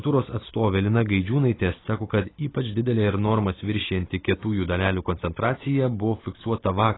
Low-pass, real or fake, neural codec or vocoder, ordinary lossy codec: 7.2 kHz; real; none; AAC, 16 kbps